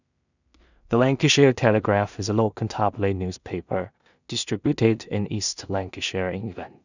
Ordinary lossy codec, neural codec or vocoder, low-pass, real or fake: none; codec, 16 kHz in and 24 kHz out, 0.4 kbps, LongCat-Audio-Codec, two codebook decoder; 7.2 kHz; fake